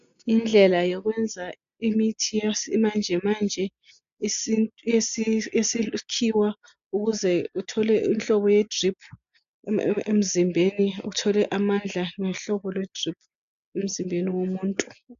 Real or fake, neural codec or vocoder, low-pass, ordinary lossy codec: real; none; 7.2 kHz; AAC, 96 kbps